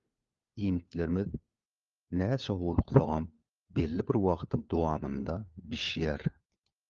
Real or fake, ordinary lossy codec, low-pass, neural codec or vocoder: fake; Opus, 32 kbps; 7.2 kHz; codec, 16 kHz, 4 kbps, FunCodec, trained on LibriTTS, 50 frames a second